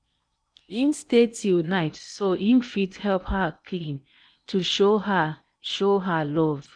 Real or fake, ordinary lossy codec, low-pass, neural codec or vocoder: fake; none; 9.9 kHz; codec, 16 kHz in and 24 kHz out, 0.8 kbps, FocalCodec, streaming, 65536 codes